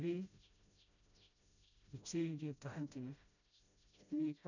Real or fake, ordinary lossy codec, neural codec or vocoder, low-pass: fake; none; codec, 16 kHz, 0.5 kbps, FreqCodec, smaller model; 7.2 kHz